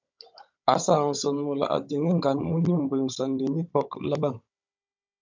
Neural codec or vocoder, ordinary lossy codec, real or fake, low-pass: codec, 16 kHz, 16 kbps, FunCodec, trained on Chinese and English, 50 frames a second; MP3, 64 kbps; fake; 7.2 kHz